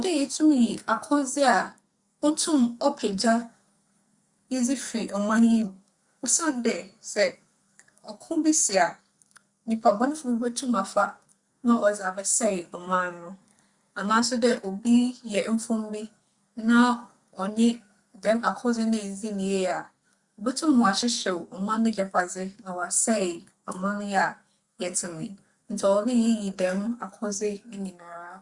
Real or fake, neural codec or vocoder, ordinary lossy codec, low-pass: fake; codec, 44.1 kHz, 2.6 kbps, SNAC; Opus, 64 kbps; 10.8 kHz